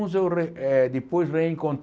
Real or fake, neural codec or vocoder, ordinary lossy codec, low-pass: real; none; none; none